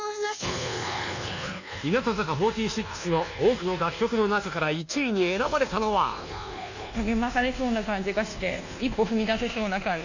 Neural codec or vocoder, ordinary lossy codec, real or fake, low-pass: codec, 24 kHz, 1.2 kbps, DualCodec; none; fake; 7.2 kHz